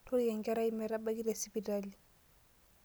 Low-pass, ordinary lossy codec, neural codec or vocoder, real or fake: none; none; none; real